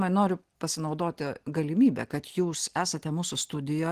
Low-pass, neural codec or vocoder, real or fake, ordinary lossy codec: 14.4 kHz; none; real; Opus, 16 kbps